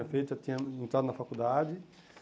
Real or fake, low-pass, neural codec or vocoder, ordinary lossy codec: real; none; none; none